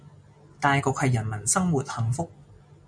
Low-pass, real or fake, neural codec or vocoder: 9.9 kHz; real; none